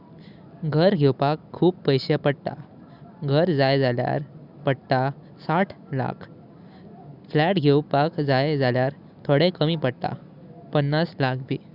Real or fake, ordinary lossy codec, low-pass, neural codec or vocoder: real; none; 5.4 kHz; none